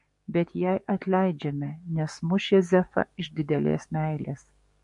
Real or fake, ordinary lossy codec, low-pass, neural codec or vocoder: fake; MP3, 48 kbps; 10.8 kHz; codec, 44.1 kHz, 7.8 kbps, DAC